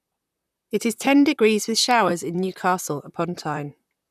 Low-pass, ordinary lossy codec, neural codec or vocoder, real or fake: 14.4 kHz; none; vocoder, 44.1 kHz, 128 mel bands, Pupu-Vocoder; fake